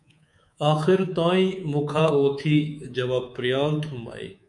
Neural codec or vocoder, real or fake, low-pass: codec, 24 kHz, 3.1 kbps, DualCodec; fake; 10.8 kHz